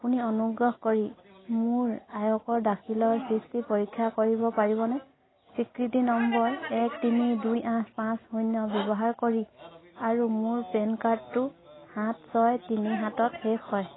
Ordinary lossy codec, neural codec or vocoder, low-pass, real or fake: AAC, 16 kbps; none; 7.2 kHz; real